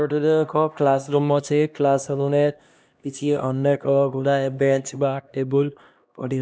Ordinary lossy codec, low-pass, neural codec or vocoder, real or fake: none; none; codec, 16 kHz, 1 kbps, X-Codec, HuBERT features, trained on LibriSpeech; fake